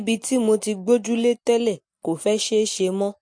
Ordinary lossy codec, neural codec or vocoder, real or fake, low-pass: MP3, 48 kbps; none; real; 9.9 kHz